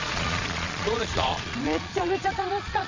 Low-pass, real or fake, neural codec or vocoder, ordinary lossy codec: 7.2 kHz; fake; vocoder, 22.05 kHz, 80 mel bands, Vocos; MP3, 64 kbps